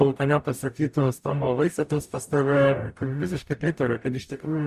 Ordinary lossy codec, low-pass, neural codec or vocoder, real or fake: Opus, 64 kbps; 14.4 kHz; codec, 44.1 kHz, 0.9 kbps, DAC; fake